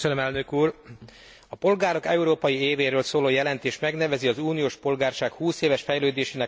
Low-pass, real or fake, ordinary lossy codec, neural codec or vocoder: none; real; none; none